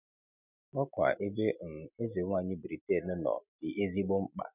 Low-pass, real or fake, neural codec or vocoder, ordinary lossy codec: 3.6 kHz; real; none; none